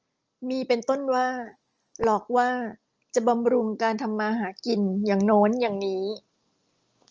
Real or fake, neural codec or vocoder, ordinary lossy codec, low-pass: real; none; Opus, 24 kbps; 7.2 kHz